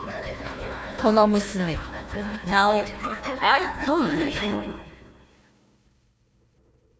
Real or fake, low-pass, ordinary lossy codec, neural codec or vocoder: fake; none; none; codec, 16 kHz, 1 kbps, FunCodec, trained on Chinese and English, 50 frames a second